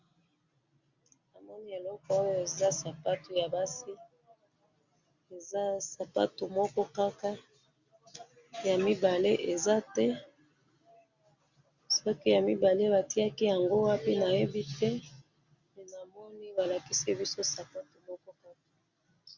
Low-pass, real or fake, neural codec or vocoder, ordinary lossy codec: 7.2 kHz; real; none; Opus, 64 kbps